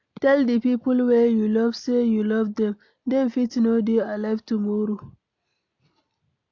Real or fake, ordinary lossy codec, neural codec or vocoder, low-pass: real; AAC, 48 kbps; none; 7.2 kHz